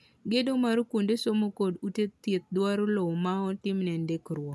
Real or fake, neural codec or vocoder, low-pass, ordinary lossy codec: real; none; none; none